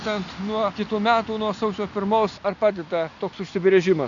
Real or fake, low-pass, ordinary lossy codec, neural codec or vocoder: real; 7.2 kHz; AAC, 64 kbps; none